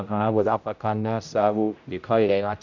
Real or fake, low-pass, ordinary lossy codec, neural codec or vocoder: fake; 7.2 kHz; none; codec, 16 kHz, 0.5 kbps, X-Codec, HuBERT features, trained on general audio